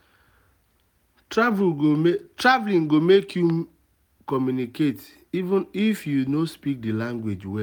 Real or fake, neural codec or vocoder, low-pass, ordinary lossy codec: real; none; none; none